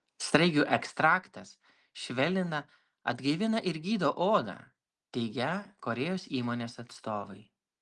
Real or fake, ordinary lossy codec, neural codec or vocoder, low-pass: real; Opus, 24 kbps; none; 10.8 kHz